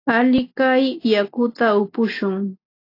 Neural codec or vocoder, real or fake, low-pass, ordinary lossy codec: none; real; 5.4 kHz; AAC, 32 kbps